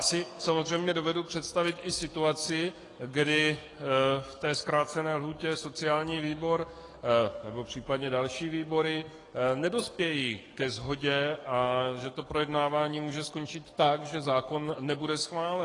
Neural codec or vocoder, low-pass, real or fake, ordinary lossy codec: codec, 44.1 kHz, 7.8 kbps, DAC; 10.8 kHz; fake; AAC, 32 kbps